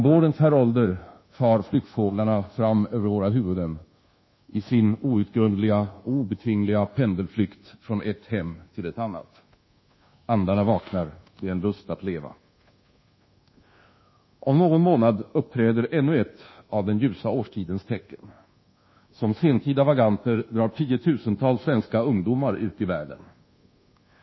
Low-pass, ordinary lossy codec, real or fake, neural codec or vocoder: 7.2 kHz; MP3, 24 kbps; fake; codec, 24 kHz, 1.2 kbps, DualCodec